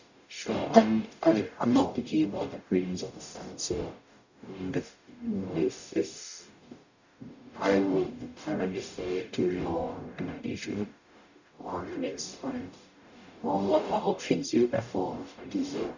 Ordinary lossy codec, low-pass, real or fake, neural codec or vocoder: none; 7.2 kHz; fake; codec, 44.1 kHz, 0.9 kbps, DAC